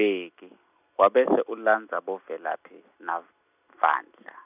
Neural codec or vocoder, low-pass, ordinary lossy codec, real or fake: none; 3.6 kHz; none; real